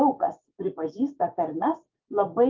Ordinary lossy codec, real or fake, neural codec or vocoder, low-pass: Opus, 32 kbps; fake; vocoder, 24 kHz, 100 mel bands, Vocos; 7.2 kHz